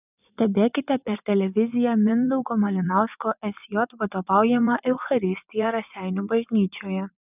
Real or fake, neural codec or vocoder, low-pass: fake; vocoder, 24 kHz, 100 mel bands, Vocos; 3.6 kHz